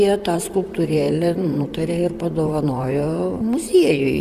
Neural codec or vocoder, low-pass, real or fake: vocoder, 44.1 kHz, 128 mel bands, Pupu-Vocoder; 14.4 kHz; fake